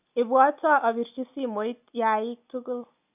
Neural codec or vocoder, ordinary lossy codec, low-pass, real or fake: none; none; 3.6 kHz; real